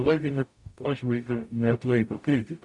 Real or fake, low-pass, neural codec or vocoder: fake; 10.8 kHz; codec, 44.1 kHz, 0.9 kbps, DAC